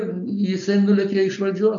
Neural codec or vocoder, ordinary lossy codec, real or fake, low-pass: none; AAC, 64 kbps; real; 7.2 kHz